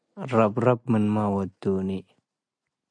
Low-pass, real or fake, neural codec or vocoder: 9.9 kHz; real; none